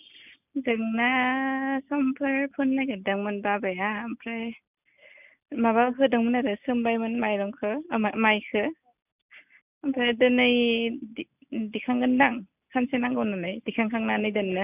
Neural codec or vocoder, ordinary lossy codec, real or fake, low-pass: none; none; real; 3.6 kHz